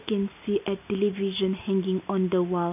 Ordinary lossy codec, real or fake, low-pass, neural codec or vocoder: none; real; 3.6 kHz; none